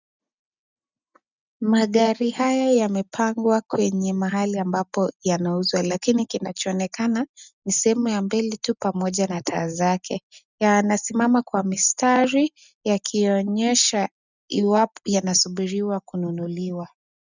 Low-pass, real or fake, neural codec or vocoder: 7.2 kHz; real; none